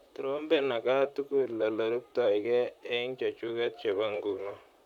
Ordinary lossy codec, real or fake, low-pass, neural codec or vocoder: none; fake; 19.8 kHz; vocoder, 44.1 kHz, 128 mel bands, Pupu-Vocoder